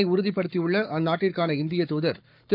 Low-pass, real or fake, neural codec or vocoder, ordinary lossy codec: 5.4 kHz; fake; codec, 44.1 kHz, 7.8 kbps, Pupu-Codec; none